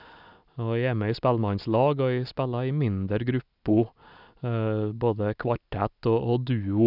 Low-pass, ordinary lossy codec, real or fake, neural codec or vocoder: 5.4 kHz; none; real; none